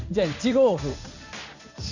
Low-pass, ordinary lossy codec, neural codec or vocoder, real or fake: 7.2 kHz; none; codec, 16 kHz in and 24 kHz out, 1 kbps, XY-Tokenizer; fake